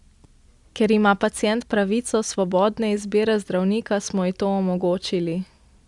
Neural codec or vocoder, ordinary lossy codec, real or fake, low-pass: none; Opus, 64 kbps; real; 10.8 kHz